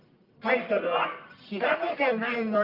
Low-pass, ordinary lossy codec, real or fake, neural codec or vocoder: 5.4 kHz; Opus, 24 kbps; fake; codec, 44.1 kHz, 1.7 kbps, Pupu-Codec